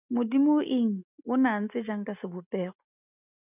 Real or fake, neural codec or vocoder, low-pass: real; none; 3.6 kHz